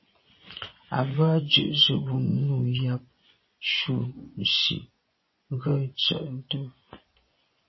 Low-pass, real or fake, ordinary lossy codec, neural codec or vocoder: 7.2 kHz; fake; MP3, 24 kbps; vocoder, 24 kHz, 100 mel bands, Vocos